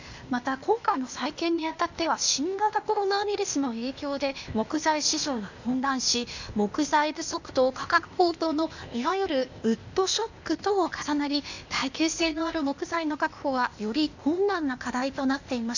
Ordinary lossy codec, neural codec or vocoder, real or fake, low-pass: none; codec, 16 kHz, 0.8 kbps, ZipCodec; fake; 7.2 kHz